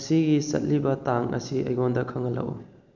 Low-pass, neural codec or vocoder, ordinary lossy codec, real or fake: 7.2 kHz; none; none; real